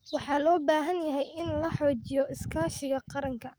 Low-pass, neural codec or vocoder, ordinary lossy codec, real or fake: none; codec, 44.1 kHz, 7.8 kbps, DAC; none; fake